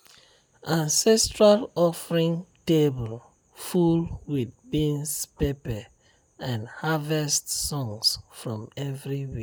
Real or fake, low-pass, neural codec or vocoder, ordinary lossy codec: real; none; none; none